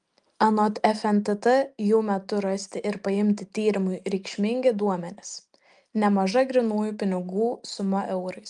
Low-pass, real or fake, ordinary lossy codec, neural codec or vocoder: 9.9 kHz; real; Opus, 32 kbps; none